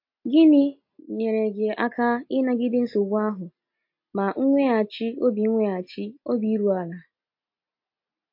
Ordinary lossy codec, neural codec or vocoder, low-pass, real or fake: MP3, 32 kbps; none; 5.4 kHz; real